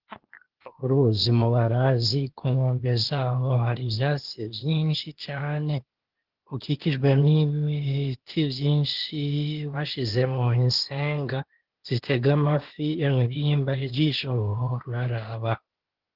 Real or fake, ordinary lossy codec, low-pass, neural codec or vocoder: fake; Opus, 16 kbps; 5.4 kHz; codec, 16 kHz, 0.8 kbps, ZipCodec